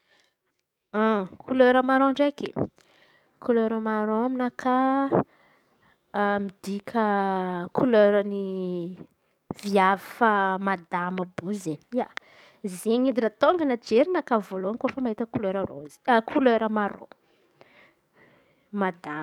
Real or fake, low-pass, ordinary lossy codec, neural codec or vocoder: fake; 19.8 kHz; none; codec, 44.1 kHz, 7.8 kbps, DAC